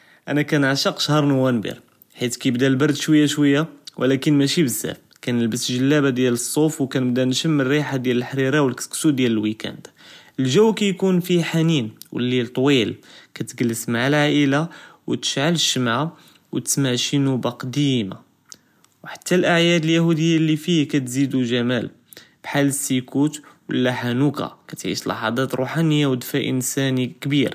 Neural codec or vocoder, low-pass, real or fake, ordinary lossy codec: none; 14.4 kHz; real; none